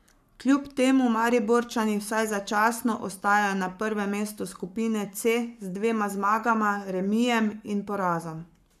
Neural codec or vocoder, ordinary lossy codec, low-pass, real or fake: codec, 44.1 kHz, 7.8 kbps, Pupu-Codec; none; 14.4 kHz; fake